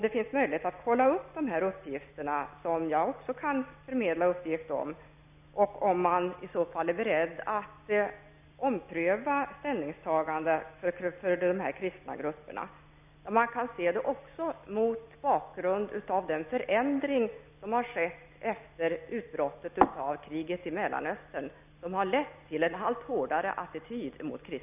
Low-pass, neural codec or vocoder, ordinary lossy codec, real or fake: 3.6 kHz; none; none; real